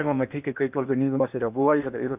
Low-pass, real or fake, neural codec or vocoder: 3.6 kHz; fake; codec, 16 kHz in and 24 kHz out, 0.8 kbps, FocalCodec, streaming, 65536 codes